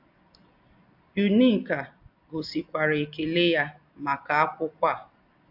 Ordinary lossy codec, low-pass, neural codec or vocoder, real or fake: AAC, 48 kbps; 5.4 kHz; none; real